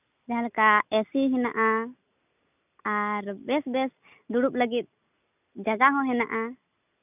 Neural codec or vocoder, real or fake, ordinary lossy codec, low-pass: none; real; none; 3.6 kHz